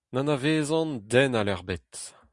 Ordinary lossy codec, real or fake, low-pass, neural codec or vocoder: Opus, 64 kbps; real; 10.8 kHz; none